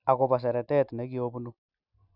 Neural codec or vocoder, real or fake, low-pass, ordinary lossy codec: none; real; 5.4 kHz; none